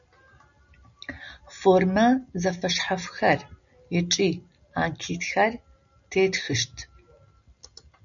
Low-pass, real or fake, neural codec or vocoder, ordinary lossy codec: 7.2 kHz; real; none; MP3, 96 kbps